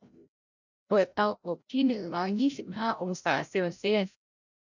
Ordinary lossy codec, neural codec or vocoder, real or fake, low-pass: none; codec, 16 kHz, 0.5 kbps, FreqCodec, larger model; fake; 7.2 kHz